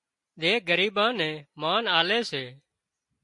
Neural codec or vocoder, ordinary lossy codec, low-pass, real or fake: none; MP3, 48 kbps; 10.8 kHz; real